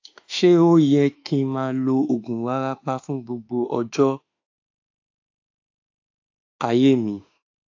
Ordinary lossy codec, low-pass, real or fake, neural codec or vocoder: AAC, 48 kbps; 7.2 kHz; fake; autoencoder, 48 kHz, 32 numbers a frame, DAC-VAE, trained on Japanese speech